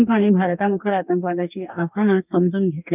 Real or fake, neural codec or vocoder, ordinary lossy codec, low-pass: fake; codec, 44.1 kHz, 2.6 kbps, DAC; none; 3.6 kHz